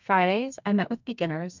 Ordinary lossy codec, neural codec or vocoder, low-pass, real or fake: MP3, 64 kbps; codec, 32 kHz, 1.9 kbps, SNAC; 7.2 kHz; fake